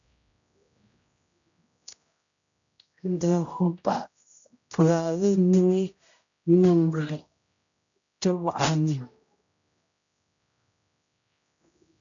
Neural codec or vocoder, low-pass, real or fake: codec, 16 kHz, 0.5 kbps, X-Codec, HuBERT features, trained on general audio; 7.2 kHz; fake